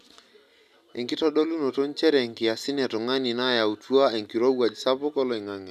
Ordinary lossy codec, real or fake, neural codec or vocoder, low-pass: none; real; none; 14.4 kHz